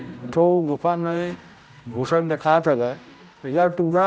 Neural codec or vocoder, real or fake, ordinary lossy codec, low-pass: codec, 16 kHz, 0.5 kbps, X-Codec, HuBERT features, trained on general audio; fake; none; none